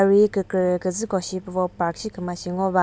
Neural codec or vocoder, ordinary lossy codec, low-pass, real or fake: none; none; none; real